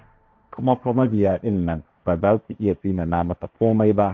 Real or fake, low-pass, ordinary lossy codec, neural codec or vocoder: fake; none; none; codec, 16 kHz, 1.1 kbps, Voila-Tokenizer